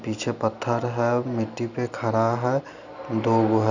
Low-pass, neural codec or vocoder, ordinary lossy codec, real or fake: 7.2 kHz; none; none; real